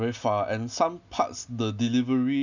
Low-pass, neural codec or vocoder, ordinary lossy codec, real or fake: 7.2 kHz; none; none; real